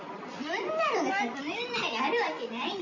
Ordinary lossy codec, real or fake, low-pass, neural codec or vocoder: none; real; 7.2 kHz; none